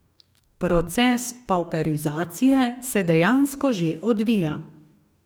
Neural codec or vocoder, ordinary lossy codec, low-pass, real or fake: codec, 44.1 kHz, 2.6 kbps, DAC; none; none; fake